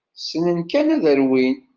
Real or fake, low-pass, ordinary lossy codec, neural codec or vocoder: real; 7.2 kHz; Opus, 32 kbps; none